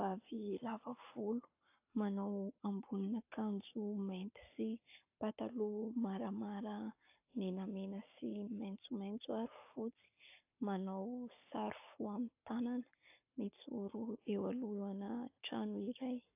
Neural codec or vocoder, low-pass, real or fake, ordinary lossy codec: codec, 44.1 kHz, 7.8 kbps, Pupu-Codec; 3.6 kHz; fake; AAC, 32 kbps